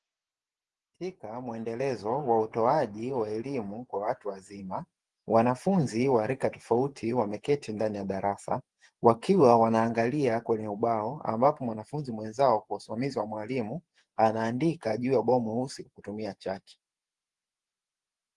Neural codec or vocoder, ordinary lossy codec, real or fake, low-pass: vocoder, 48 kHz, 128 mel bands, Vocos; Opus, 24 kbps; fake; 10.8 kHz